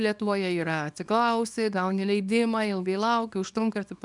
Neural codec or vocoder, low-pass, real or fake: codec, 24 kHz, 0.9 kbps, WavTokenizer, small release; 10.8 kHz; fake